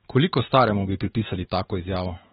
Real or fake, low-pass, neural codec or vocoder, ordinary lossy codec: fake; 19.8 kHz; autoencoder, 48 kHz, 128 numbers a frame, DAC-VAE, trained on Japanese speech; AAC, 16 kbps